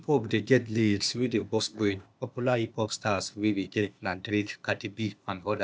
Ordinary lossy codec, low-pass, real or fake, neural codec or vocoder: none; none; fake; codec, 16 kHz, 0.8 kbps, ZipCodec